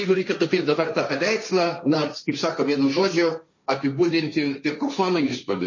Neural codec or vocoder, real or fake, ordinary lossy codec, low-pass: codec, 16 kHz, 1.1 kbps, Voila-Tokenizer; fake; MP3, 32 kbps; 7.2 kHz